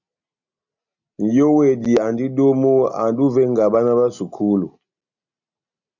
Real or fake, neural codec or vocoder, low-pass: real; none; 7.2 kHz